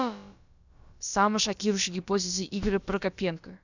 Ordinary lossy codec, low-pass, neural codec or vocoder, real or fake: none; 7.2 kHz; codec, 16 kHz, about 1 kbps, DyCAST, with the encoder's durations; fake